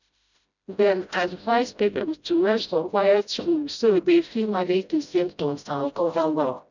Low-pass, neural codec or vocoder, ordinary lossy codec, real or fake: 7.2 kHz; codec, 16 kHz, 0.5 kbps, FreqCodec, smaller model; none; fake